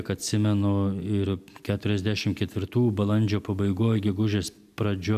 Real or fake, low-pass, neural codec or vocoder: real; 14.4 kHz; none